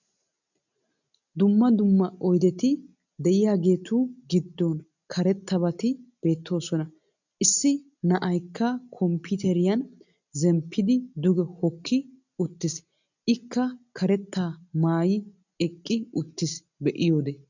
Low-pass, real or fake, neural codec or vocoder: 7.2 kHz; real; none